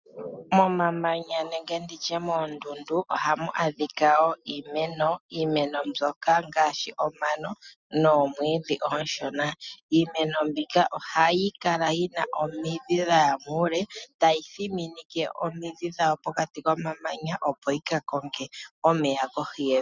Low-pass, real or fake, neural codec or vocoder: 7.2 kHz; real; none